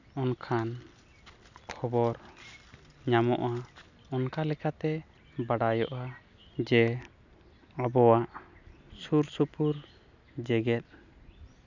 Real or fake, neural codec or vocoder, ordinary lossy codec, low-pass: real; none; none; 7.2 kHz